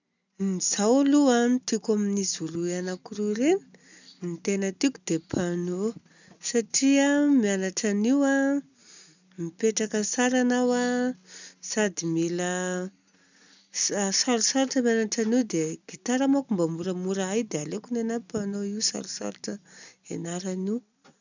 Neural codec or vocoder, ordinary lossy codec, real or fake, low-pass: none; none; real; 7.2 kHz